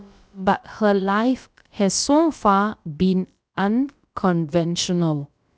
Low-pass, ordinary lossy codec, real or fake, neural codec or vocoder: none; none; fake; codec, 16 kHz, about 1 kbps, DyCAST, with the encoder's durations